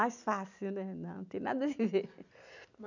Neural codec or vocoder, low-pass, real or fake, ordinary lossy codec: none; 7.2 kHz; real; none